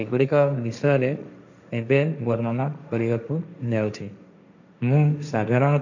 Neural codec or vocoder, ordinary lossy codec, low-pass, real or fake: codec, 16 kHz, 1.1 kbps, Voila-Tokenizer; none; 7.2 kHz; fake